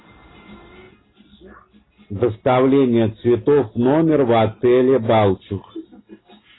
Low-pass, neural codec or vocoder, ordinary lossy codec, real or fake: 7.2 kHz; none; AAC, 16 kbps; real